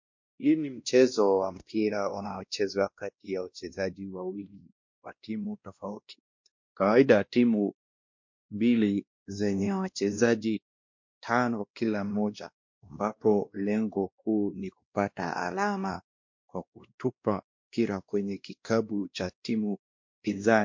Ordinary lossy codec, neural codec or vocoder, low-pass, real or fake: MP3, 48 kbps; codec, 16 kHz, 1 kbps, X-Codec, WavLM features, trained on Multilingual LibriSpeech; 7.2 kHz; fake